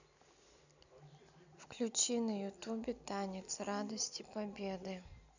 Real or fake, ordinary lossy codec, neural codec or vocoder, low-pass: real; none; none; 7.2 kHz